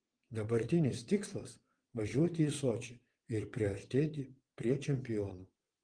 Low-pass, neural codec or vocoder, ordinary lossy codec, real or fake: 9.9 kHz; vocoder, 22.05 kHz, 80 mel bands, WaveNeXt; Opus, 24 kbps; fake